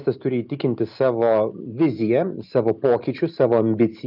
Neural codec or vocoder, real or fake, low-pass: none; real; 5.4 kHz